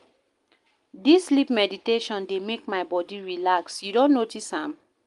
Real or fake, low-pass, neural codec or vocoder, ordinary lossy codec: real; 10.8 kHz; none; Opus, 32 kbps